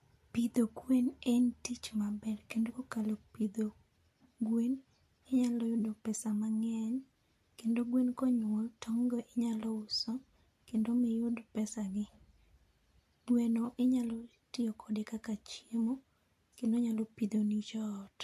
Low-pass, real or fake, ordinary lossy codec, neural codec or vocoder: 14.4 kHz; real; MP3, 64 kbps; none